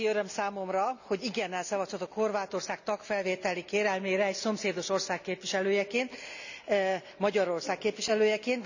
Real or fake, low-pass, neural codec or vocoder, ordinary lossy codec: real; 7.2 kHz; none; none